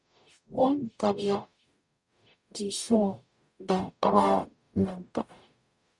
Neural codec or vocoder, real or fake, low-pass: codec, 44.1 kHz, 0.9 kbps, DAC; fake; 10.8 kHz